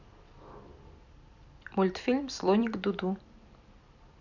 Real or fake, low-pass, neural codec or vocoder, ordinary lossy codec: fake; 7.2 kHz; vocoder, 44.1 kHz, 128 mel bands every 256 samples, BigVGAN v2; none